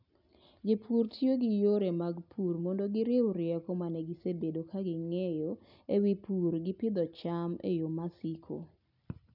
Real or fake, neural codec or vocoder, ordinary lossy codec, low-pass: real; none; none; 5.4 kHz